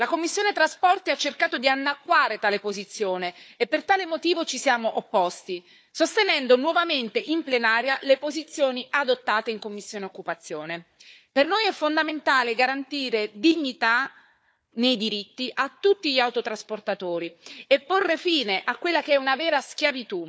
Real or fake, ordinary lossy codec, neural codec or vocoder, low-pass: fake; none; codec, 16 kHz, 4 kbps, FunCodec, trained on Chinese and English, 50 frames a second; none